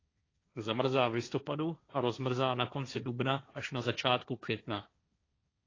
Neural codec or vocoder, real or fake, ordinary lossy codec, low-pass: codec, 16 kHz, 1.1 kbps, Voila-Tokenizer; fake; AAC, 32 kbps; 7.2 kHz